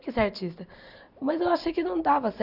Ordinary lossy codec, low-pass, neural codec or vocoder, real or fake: none; 5.4 kHz; none; real